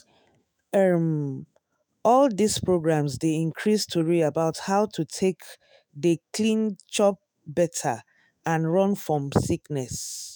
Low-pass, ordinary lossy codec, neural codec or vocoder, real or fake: none; none; autoencoder, 48 kHz, 128 numbers a frame, DAC-VAE, trained on Japanese speech; fake